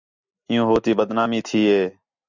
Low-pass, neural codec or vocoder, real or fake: 7.2 kHz; none; real